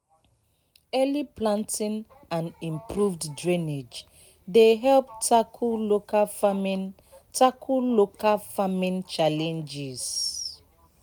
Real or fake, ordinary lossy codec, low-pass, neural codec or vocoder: real; none; none; none